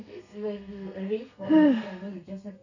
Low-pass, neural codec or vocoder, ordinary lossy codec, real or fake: 7.2 kHz; codec, 32 kHz, 1.9 kbps, SNAC; none; fake